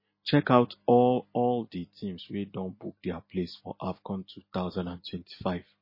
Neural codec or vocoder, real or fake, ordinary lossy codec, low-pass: none; real; MP3, 24 kbps; 5.4 kHz